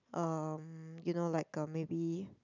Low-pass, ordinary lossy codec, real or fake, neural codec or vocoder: 7.2 kHz; none; real; none